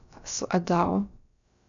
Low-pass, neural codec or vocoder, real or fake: 7.2 kHz; codec, 16 kHz, about 1 kbps, DyCAST, with the encoder's durations; fake